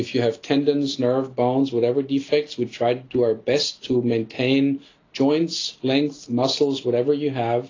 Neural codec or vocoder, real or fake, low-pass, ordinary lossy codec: none; real; 7.2 kHz; AAC, 32 kbps